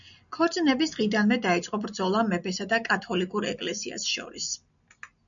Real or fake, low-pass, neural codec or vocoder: real; 7.2 kHz; none